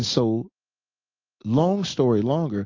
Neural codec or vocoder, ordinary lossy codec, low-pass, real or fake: none; AAC, 48 kbps; 7.2 kHz; real